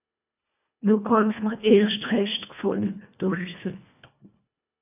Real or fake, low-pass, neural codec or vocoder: fake; 3.6 kHz; codec, 24 kHz, 1.5 kbps, HILCodec